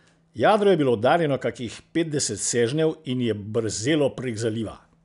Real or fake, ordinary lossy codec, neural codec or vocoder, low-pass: real; none; none; 10.8 kHz